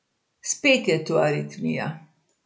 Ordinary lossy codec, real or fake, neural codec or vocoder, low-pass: none; real; none; none